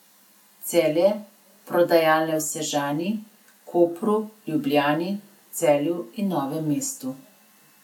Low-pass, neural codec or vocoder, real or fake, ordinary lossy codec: 19.8 kHz; none; real; none